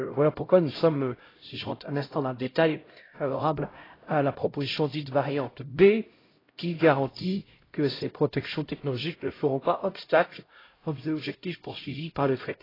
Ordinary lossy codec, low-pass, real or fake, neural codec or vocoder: AAC, 24 kbps; 5.4 kHz; fake; codec, 16 kHz, 0.5 kbps, X-Codec, HuBERT features, trained on LibriSpeech